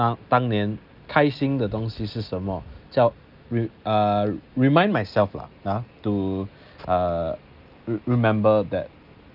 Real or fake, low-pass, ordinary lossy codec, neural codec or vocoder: real; 5.4 kHz; Opus, 24 kbps; none